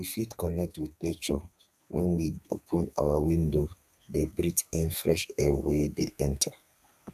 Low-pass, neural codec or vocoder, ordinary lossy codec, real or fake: 14.4 kHz; codec, 32 kHz, 1.9 kbps, SNAC; none; fake